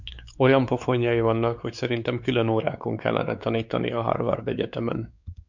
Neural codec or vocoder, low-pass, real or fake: codec, 16 kHz, 4 kbps, X-Codec, WavLM features, trained on Multilingual LibriSpeech; 7.2 kHz; fake